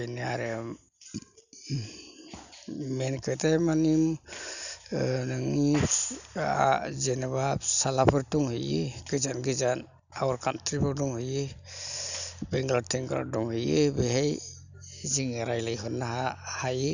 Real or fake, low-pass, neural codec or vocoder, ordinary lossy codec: real; 7.2 kHz; none; none